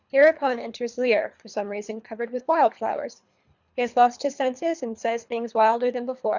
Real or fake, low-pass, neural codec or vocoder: fake; 7.2 kHz; codec, 24 kHz, 3 kbps, HILCodec